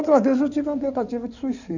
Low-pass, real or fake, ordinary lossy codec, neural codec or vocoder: 7.2 kHz; fake; none; codec, 16 kHz in and 24 kHz out, 2.2 kbps, FireRedTTS-2 codec